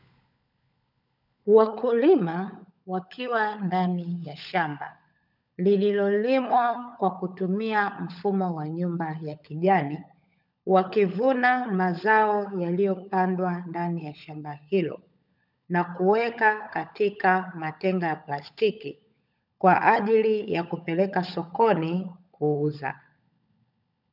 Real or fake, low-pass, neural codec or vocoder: fake; 5.4 kHz; codec, 16 kHz, 16 kbps, FunCodec, trained on LibriTTS, 50 frames a second